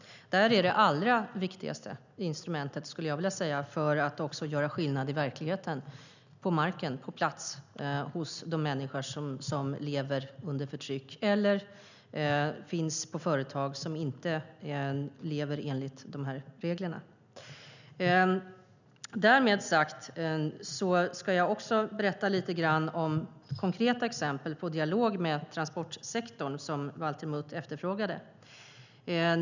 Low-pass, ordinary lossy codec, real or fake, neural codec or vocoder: 7.2 kHz; none; real; none